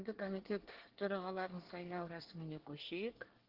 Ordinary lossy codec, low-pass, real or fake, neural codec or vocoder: Opus, 16 kbps; 5.4 kHz; fake; codec, 24 kHz, 1 kbps, SNAC